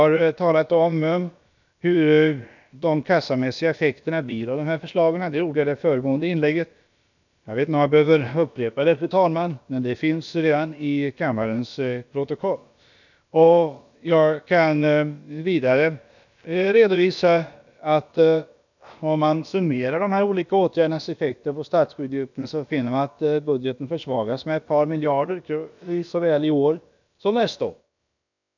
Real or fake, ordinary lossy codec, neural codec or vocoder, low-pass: fake; none; codec, 16 kHz, about 1 kbps, DyCAST, with the encoder's durations; 7.2 kHz